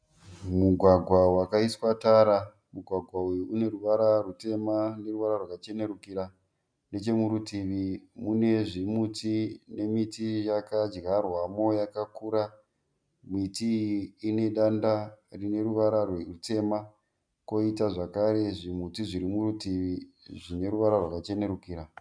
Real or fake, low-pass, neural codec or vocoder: real; 9.9 kHz; none